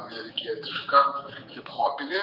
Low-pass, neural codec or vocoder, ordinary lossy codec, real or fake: 5.4 kHz; codec, 16 kHz, 4 kbps, X-Codec, HuBERT features, trained on general audio; Opus, 24 kbps; fake